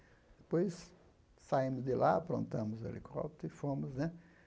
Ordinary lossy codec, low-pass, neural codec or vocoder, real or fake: none; none; none; real